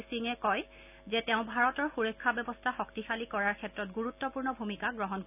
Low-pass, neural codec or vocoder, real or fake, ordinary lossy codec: 3.6 kHz; none; real; none